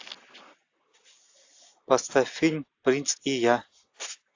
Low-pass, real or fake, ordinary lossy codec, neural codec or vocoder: 7.2 kHz; real; AAC, 48 kbps; none